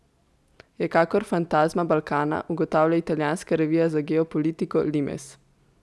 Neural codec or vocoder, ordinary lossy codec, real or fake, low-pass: none; none; real; none